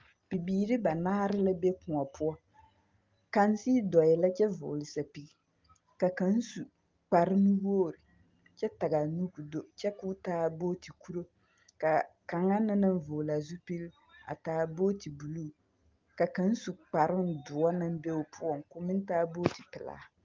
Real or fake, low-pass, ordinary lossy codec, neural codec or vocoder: real; 7.2 kHz; Opus, 32 kbps; none